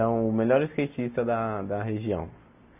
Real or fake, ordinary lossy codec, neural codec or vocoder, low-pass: real; none; none; 3.6 kHz